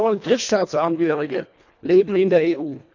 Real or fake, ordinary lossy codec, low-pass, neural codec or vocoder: fake; none; 7.2 kHz; codec, 24 kHz, 1.5 kbps, HILCodec